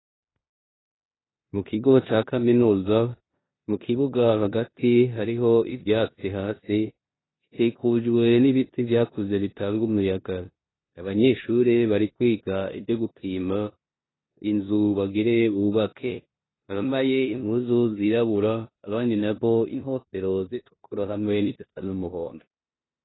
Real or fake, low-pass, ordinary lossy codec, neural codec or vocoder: fake; 7.2 kHz; AAC, 16 kbps; codec, 16 kHz in and 24 kHz out, 0.9 kbps, LongCat-Audio-Codec, four codebook decoder